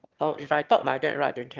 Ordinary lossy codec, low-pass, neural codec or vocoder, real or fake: Opus, 32 kbps; 7.2 kHz; autoencoder, 22.05 kHz, a latent of 192 numbers a frame, VITS, trained on one speaker; fake